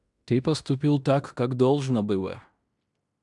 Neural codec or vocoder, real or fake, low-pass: codec, 16 kHz in and 24 kHz out, 0.9 kbps, LongCat-Audio-Codec, fine tuned four codebook decoder; fake; 10.8 kHz